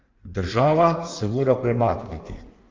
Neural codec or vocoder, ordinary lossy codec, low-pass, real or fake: codec, 16 kHz in and 24 kHz out, 1.1 kbps, FireRedTTS-2 codec; Opus, 32 kbps; 7.2 kHz; fake